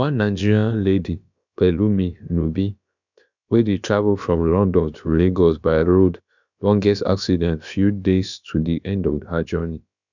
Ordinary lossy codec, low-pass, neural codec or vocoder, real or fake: none; 7.2 kHz; codec, 16 kHz, about 1 kbps, DyCAST, with the encoder's durations; fake